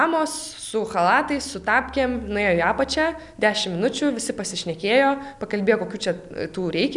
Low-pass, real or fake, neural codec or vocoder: 10.8 kHz; real; none